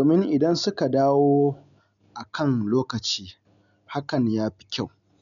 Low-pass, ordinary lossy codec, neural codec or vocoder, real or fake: 7.2 kHz; none; none; real